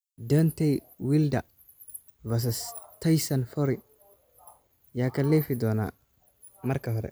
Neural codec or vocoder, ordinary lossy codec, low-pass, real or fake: none; none; none; real